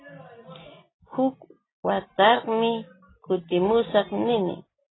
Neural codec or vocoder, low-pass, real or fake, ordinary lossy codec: none; 7.2 kHz; real; AAC, 16 kbps